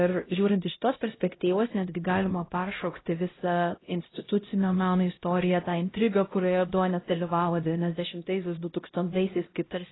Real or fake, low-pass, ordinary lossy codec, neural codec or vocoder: fake; 7.2 kHz; AAC, 16 kbps; codec, 16 kHz, 0.5 kbps, X-Codec, HuBERT features, trained on LibriSpeech